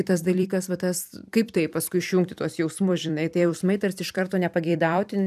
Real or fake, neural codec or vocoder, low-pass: fake; vocoder, 44.1 kHz, 128 mel bands every 256 samples, BigVGAN v2; 14.4 kHz